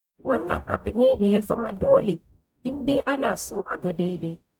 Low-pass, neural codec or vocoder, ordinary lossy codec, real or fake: 19.8 kHz; codec, 44.1 kHz, 0.9 kbps, DAC; none; fake